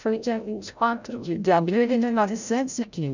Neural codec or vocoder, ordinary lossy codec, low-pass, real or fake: codec, 16 kHz, 0.5 kbps, FreqCodec, larger model; none; 7.2 kHz; fake